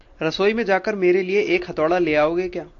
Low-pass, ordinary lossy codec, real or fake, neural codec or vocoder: 7.2 kHz; AAC, 48 kbps; real; none